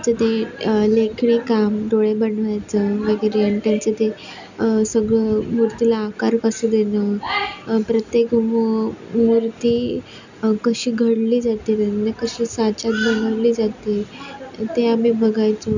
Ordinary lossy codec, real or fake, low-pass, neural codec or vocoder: none; real; 7.2 kHz; none